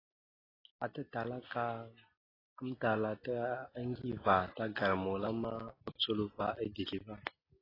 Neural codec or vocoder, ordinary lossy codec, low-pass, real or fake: none; AAC, 24 kbps; 5.4 kHz; real